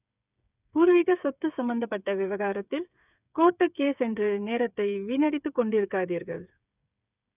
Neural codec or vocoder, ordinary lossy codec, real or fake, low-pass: codec, 16 kHz, 8 kbps, FreqCodec, smaller model; none; fake; 3.6 kHz